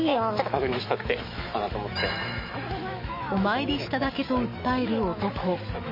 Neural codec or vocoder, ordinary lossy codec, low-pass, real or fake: autoencoder, 48 kHz, 128 numbers a frame, DAC-VAE, trained on Japanese speech; MP3, 24 kbps; 5.4 kHz; fake